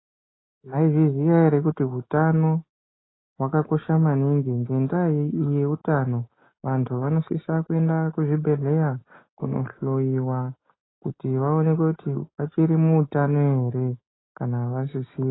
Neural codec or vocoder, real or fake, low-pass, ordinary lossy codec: none; real; 7.2 kHz; AAC, 16 kbps